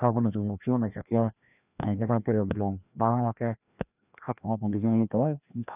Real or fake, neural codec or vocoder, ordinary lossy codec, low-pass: fake; codec, 16 kHz, 1 kbps, FreqCodec, larger model; none; 3.6 kHz